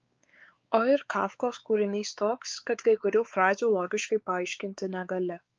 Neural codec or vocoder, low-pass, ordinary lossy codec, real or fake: codec, 16 kHz, 4 kbps, X-Codec, WavLM features, trained on Multilingual LibriSpeech; 7.2 kHz; Opus, 24 kbps; fake